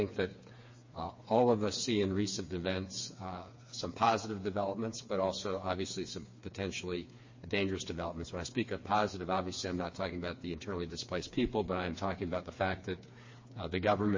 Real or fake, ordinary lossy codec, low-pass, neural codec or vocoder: fake; MP3, 32 kbps; 7.2 kHz; codec, 16 kHz, 4 kbps, FreqCodec, smaller model